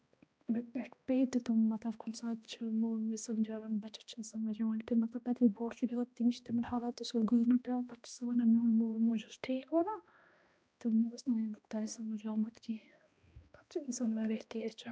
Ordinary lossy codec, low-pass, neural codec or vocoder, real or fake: none; none; codec, 16 kHz, 1 kbps, X-Codec, HuBERT features, trained on balanced general audio; fake